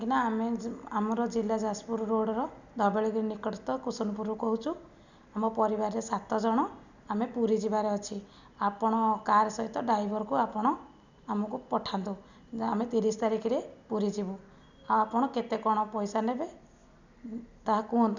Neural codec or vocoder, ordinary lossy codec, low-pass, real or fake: none; none; 7.2 kHz; real